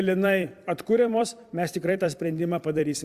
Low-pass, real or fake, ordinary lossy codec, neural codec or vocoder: 14.4 kHz; fake; Opus, 64 kbps; vocoder, 44.1 kHz, 128 mel bands every 256 samples, BigVGAN v2